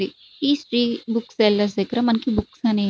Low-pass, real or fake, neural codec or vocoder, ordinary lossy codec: none; real; none; none